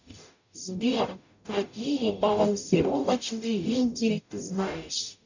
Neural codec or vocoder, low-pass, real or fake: codec, 44.1 kHz, 0.9 kbps, DAC; 7.2 kHz; fake